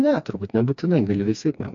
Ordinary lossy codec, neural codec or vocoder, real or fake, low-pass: AAC, 64 kbps; codec, 16 kHz, 2 kbps, FreqCodec, smaller model; fake; 7.2 kHz